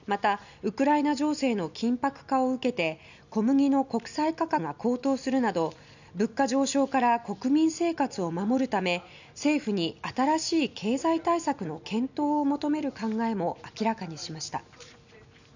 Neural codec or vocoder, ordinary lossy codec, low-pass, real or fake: none; none; 7.2 kHz; real